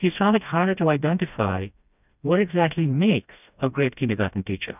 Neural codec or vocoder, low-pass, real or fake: codec, 16 kHz, 1 kbps, FreqCodec, smaller model; 3.6 kHz; fake